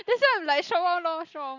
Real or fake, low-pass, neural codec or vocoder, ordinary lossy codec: real; 7.2 kHz; none; none